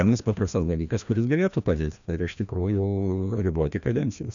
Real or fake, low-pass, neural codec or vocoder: fake; 7.2 kHz; codec, 16 kHz, 1 kbps, FreqCodec, larger model